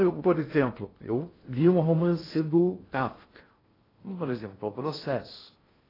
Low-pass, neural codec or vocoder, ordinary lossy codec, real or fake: 5.4 kHz; codec, 16 kHz in and 24 kHz out, 0.6 kbps, FocalCodec, streaming, 2048 codes; AAC, 24 kbps; fake